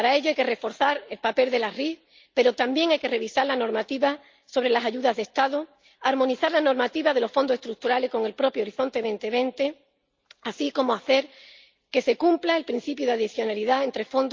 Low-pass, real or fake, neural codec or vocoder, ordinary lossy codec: 7.2 kHz; real; none; Opus, 24 kbps